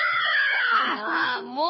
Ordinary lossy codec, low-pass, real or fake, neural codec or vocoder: MP3, 24 kbps; 7.2 kHz; fake; codec, 24 kHz, 6 kbps, HILCodec